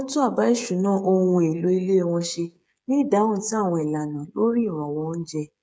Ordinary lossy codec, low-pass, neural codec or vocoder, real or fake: none; none; codec, 16 kHz, 8 kbps, FreqCodec, smaller model; fake